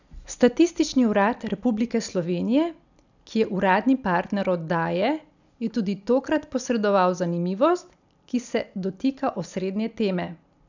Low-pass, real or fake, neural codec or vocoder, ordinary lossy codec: 7.2 kHz; real; none; none